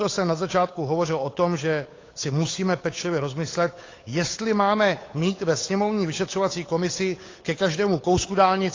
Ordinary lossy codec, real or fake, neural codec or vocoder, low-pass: AAC, 32 kbps; fake; codec, 16 kHz, 8 kbps, FunCodec, trained on Chinese and English, 25 frames a second; 7.2 kHz